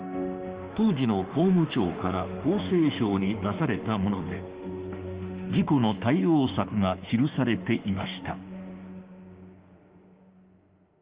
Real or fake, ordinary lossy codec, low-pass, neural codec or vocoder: fake; Opus, 32 kbps; 3.6 kHz; autoencoder, 48 kHz, 32 numbers a frame, DAC-VAE, trained on Japanese speech